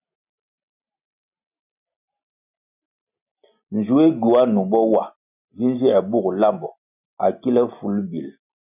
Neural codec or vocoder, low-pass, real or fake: none; 3.6 kHz; real